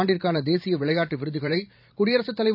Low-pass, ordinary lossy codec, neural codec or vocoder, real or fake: 5.4 kHz; none; vocoder, 44.1 kHz, 128 mel bands every 512 samples, BigVGAN v2; fake